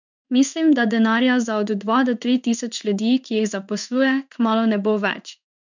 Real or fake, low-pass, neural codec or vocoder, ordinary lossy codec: fake; 7.2 kHz; codec, 16 kHz in and 24 kHz out, 1 kbps, XY-Tokenizer; none